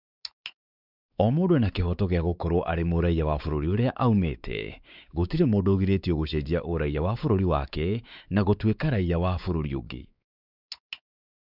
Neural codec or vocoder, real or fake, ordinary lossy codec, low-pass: codec, 16 kHz, 4 kbps, X-Codec, WavLM features, trained on Multilingual LibriSpeech; fake; none; 5.4 kHz